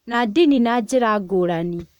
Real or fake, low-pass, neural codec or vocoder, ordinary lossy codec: fake; 19.8 kHz; vocoder, 44.1 kHz, 128 mel bands, Pupu-Vocoder; none